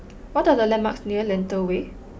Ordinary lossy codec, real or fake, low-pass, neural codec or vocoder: none; real; none; none